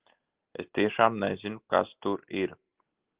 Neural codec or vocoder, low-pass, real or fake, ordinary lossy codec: none; 3.6 kHz; real; Opus, 16 kbps